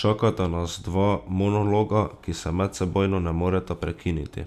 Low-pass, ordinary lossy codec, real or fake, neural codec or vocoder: 14.4 kHz; none; real; none